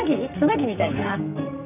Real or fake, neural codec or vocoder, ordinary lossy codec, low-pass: fake; codec, 44.1 kHz, 2.6 kbps, SNAC; none; 3.6 kHz